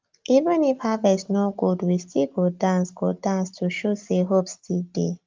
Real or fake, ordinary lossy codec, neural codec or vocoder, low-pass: real; Opus, 32 kbps; none; 7.2 kHz